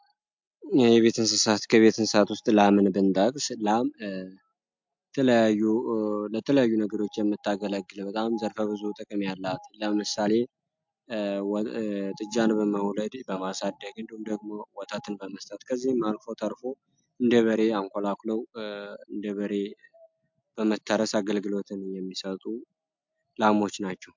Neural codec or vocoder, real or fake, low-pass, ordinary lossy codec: none; real; 7.2 kHz; MP3, 64 kbps